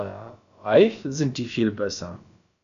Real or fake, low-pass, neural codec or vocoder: fake; 7.2 kHz; codec, 16 kHz, about 1 kbps, DyCAST, with the encoder's durations